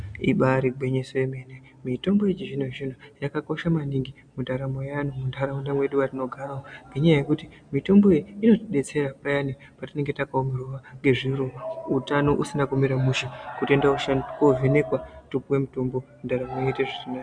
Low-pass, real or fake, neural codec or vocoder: 9.9 kHz; real; none